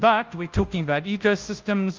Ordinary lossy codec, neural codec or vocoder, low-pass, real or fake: Opus, 32 kbps; codec, 16 kHz, 0.5 kbps, FunCodec, trained on Chinese and English, 25 frames a second; 7.2 kHz; fake